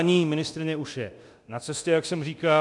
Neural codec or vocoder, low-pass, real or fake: codec, 24 kHz, 0.9 kbps, DualCodec; 10.8 kHz; fake